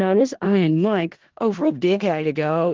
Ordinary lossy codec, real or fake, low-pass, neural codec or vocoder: Opus, 16 kbps; fake; 7.2 kHz; codec, 16 kHz in and 24 kHz out, 0.4 kbps, LongCat-Audio-Codec, four codebook decoder